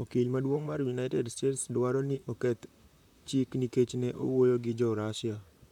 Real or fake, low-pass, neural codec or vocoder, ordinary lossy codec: fake; 19.8 kHz; vocoder, 44.1 kHz, 128 mel bands, Pupu-Vocoder; none